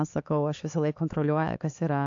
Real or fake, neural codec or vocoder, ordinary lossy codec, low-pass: fake; codec, 16 kHz, 4 kbps, X-Codec, HuBERT features, trained on LibriSpeech; AAC, 48 kbps; 7.2 kHz